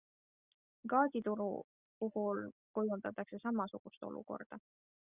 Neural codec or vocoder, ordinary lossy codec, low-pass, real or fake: none; Opus, 64 kbps; 3.6 kHz; real